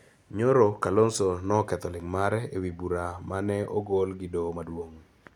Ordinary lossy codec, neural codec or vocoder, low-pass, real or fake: none; none; 19.8 kHz; real